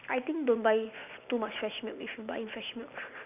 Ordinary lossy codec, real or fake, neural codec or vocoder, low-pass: none; real; none; 3.6 kHz